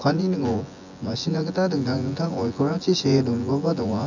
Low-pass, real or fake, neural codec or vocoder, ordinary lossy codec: 7.2 kHz; fake; vocoder, 24 kHz, 100 mel bands, Vocos; none